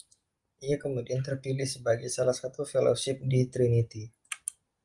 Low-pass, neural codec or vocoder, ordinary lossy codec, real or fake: 10.8 kHz; none; Opus, 32 kbps; real